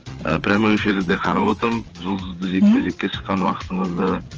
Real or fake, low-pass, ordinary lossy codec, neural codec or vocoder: fake; 7.2 kHz; Opus, 24 kbps; codec, 16 kHz, 8 kbps, FreqCodec, larger model